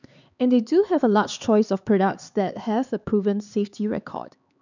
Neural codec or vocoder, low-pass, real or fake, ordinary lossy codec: codec, 16 kHz, 4 kbps, X-Codec, HuBERT features, trained on LibriSpeech; 7.2 kHz; fake; none